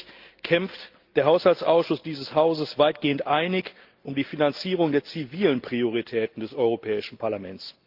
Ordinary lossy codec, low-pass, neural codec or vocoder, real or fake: Opus, 32 kbps; 5.4 kHz; none; real